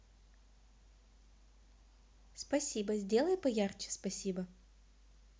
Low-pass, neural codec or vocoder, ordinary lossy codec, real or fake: none; none; none; real